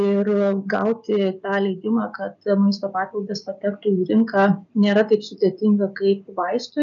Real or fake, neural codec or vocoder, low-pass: real; none; 7.2 kHz